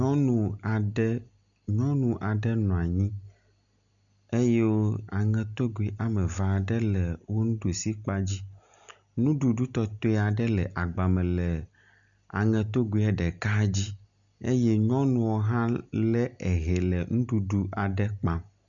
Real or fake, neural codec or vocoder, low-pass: real; none; 7.2 kHz